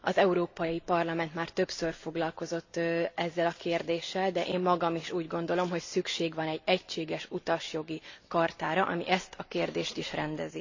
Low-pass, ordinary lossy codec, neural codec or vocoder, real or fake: 7.2 kHz; AAC, 48 kbps; none; real